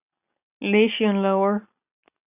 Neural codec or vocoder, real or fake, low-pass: none; real; 3.6 kHz